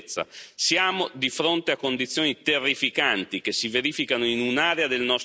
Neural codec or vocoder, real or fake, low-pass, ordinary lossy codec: none; real; none; none